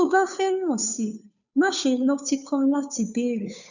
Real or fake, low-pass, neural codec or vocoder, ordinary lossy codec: fake; 7.2 kHz; codec, 16 kHz, 2 kbps, FunCodec, trained on Chinese and English, 25 frames a second; none